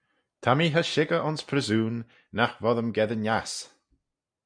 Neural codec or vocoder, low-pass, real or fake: none; 9.9 kHz; real